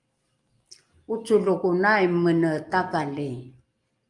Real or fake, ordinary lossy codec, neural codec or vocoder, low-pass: real; Opus, 32 kbps; none; 10.8 kHz